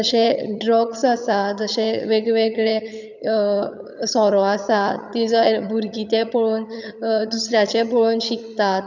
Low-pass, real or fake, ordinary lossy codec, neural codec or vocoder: 7.2 kHz; fake; none; vocoder, 22.05 kHz, 80 mel bands, HiFi-GAN